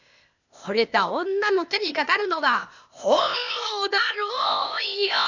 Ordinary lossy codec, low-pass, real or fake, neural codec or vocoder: none; 7.2 kHz; fake; codec, 16 kHz, 0.8 kbps, ZipCodec